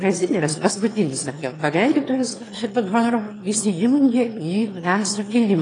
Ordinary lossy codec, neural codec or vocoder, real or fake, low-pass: AAC, 32 kbps; autoencoder, 22.05 kHz, a latent of 192 numbers a frame, VITS, trained on one speaker; fake; 9.9 kHz